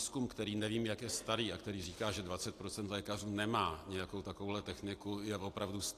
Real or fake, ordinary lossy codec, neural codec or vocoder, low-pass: real; AAC, 64 kbps; none; 14.4 kHz